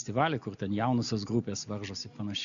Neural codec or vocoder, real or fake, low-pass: none; real; 7.2 kHz